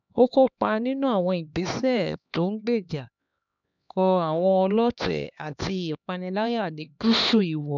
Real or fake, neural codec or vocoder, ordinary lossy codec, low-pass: fake; codec, 16 kHz, 2 kbps, X-Codec, HuBERT features, trained on LibriSpeech; none; 7.2 kHz